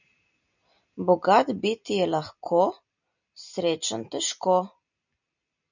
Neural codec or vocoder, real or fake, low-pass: none; real; 7.2 kHz